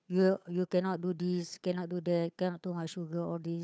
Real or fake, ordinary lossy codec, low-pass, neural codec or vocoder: fake; none; none; codec, 16 kHz, 8 kbps, FunCodec, trained on Chinese and English, 25 frames a second